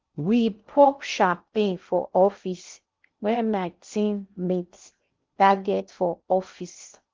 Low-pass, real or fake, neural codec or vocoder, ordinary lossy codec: 7.2 kHz; fake; codec, 16 kHz in and 24 kHz out, 0.6 kbps, FocalCodec, streaming, 2048 codes; Opus, 32 kbps